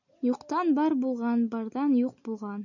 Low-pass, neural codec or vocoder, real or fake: 7.2 kHz; none; real